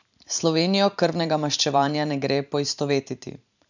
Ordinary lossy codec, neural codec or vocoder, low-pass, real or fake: none; vocoder, 44.1 kHz, 128 mel bands every 512 samples, BigVGAN v2; 7.2 kHz; fake